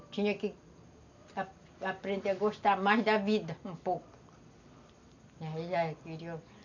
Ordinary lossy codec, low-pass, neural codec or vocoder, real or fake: none; 7.2 kHz; none; real